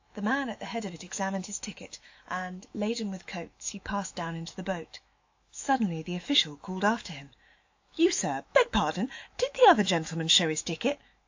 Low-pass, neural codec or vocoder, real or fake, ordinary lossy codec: 7.2 kHz; autoencoder, 48 kHz, 128 numbers a frame, DAC-VAE, trained on Japanese speech; fake; AAC, 48 kbps